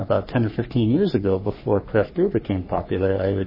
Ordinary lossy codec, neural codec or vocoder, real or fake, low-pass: MP3, 24 kbps; codec, 44.1 kHz, 3.4 kbps, Pupu-Codec; fake; 5.4 kHz